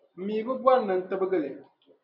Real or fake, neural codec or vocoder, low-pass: real; none; 5.4 kHz